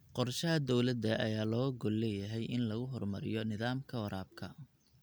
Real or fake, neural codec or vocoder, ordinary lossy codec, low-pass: real; none; none; none